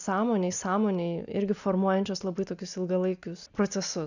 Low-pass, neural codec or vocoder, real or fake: 7.2 kHz; none; real